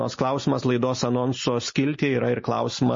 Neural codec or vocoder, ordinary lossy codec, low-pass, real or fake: none; MP3, 32 kbps; 7.2 kHz; real